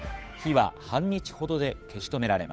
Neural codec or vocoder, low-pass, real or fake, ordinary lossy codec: codec, 16 kHz, 8 kbps, FunCodec, trained on Chinese and English, 25 frames a second; none; fake; none